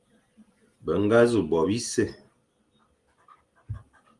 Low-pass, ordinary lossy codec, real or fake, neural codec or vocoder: 10.8 kHz; Opus, 24 kbps; real; none